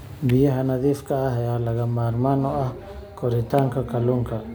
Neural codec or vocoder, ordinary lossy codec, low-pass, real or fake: none; none; none; real